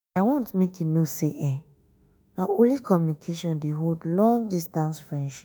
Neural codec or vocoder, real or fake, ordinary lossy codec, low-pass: autoencoder, 48 kHz, 32 numbers a frame, DAC-VAE, trained on Japanese speech; fake; none; none